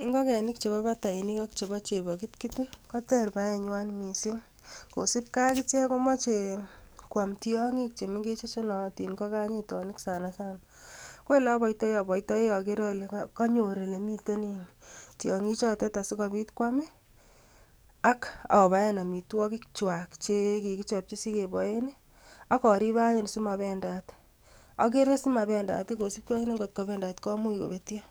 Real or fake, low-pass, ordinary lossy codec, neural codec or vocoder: fake; none; none; codec, 44.1 kHz, 7.8 kbps, DAC